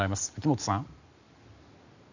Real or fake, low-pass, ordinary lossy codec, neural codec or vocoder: real; 7.2 kHz; none; none